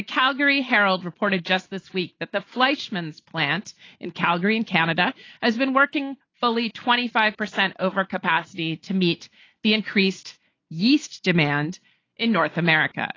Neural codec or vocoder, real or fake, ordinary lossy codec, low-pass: none; real; AAC, 32 kbps; 7.2 kHz